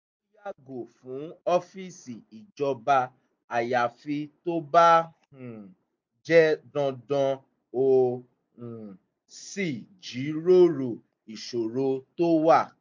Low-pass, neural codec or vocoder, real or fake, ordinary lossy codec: 7.2 kHz; none; real; MP3, 48 kbps